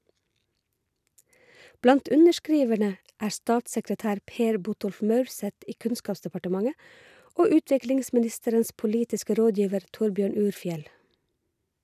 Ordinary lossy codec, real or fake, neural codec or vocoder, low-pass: none; real; none; 14.4 kHz